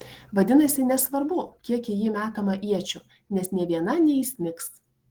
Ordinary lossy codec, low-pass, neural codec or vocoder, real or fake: Opus, 16 kbps; 19.8 kHz; none; real